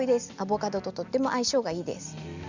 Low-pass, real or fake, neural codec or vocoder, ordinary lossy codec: 7.2 kHz; real; none; Opus, 64 kbps